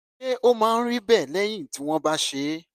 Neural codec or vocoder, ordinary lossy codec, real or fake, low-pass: none; none; real; 14.4 kHz